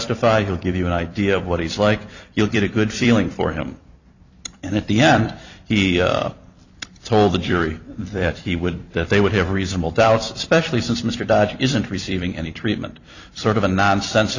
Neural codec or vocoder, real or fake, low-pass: vocoder, 44.1 kHz, 128 mel bands every 256 samples, BigVGAN v2; fake; 7.2 kHz